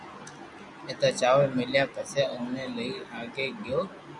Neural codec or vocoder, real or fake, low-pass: none; real; 10.8 kHz